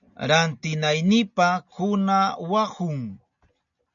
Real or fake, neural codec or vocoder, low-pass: real; none; 7.2 kHz